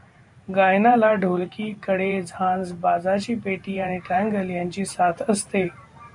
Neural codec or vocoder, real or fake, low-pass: vocoder, 44.1 kHz, 128 mel bands every 512 samples, BigVGAN v2; fake; 10.8 kHz